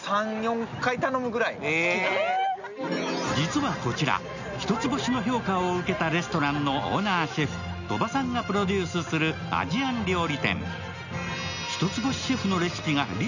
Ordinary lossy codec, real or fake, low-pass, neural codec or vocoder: none; real; 7.2 kHz; none